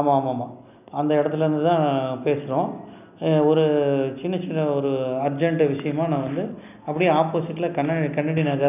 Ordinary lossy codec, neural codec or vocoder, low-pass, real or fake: none; none; 3.6 kHz; real